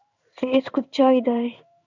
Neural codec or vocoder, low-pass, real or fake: codec, 16 kHz in and 24 kHz out, 1 kbps, XY-Tokenizer; 7.2 kHz; fake